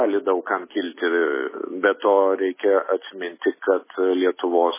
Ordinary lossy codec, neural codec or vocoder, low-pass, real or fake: MP3, 16 kbps; none; 3.6 kHz; real